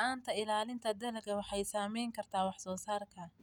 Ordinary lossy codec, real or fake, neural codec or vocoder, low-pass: none; real; none; 19.8 kHz